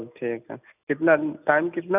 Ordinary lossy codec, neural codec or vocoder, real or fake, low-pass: none; none; real; 3.6 kHz